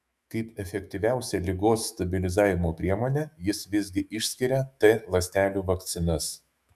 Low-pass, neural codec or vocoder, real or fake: 14.4 kHz; autoencoder, 48 kHz, 128 numbers a frame, DAC-VAE, trained on Japanese speech; fake